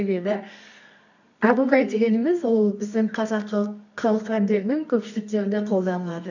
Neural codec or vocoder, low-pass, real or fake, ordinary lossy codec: codec, 24 kHz, 0.9 kbps, WavTokenizer, medium music audio release; 7.2 kHz; fake; none